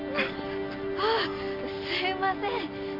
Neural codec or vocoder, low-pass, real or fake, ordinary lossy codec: none; 5.4 kHz; real; MP3, 48 kbps